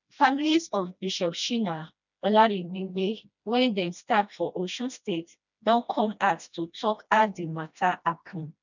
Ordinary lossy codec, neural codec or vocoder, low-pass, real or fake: none; codec, 16 kHz, 1 kbps, FreqCodec, smaller model; 7.2 kHz; fake